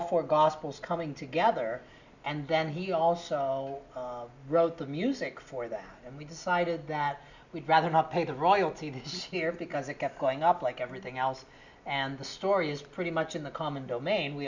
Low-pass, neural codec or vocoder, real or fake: 7.2 kHz; none; real